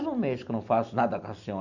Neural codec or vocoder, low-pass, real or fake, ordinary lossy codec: none; 7.2 kHz; real; none